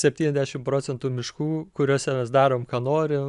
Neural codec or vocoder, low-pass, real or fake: none; 10.8 kHz; real